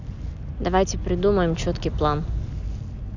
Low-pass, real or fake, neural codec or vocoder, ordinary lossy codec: 7.2 kHz; real; none; none